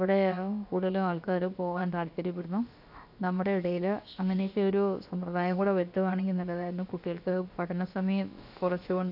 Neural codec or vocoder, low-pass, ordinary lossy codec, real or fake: codec, 16 kHz, about 1 kbps, DyCAST, with the encoder's durations; 5.4 kHz; AAC, 48 kbps; fake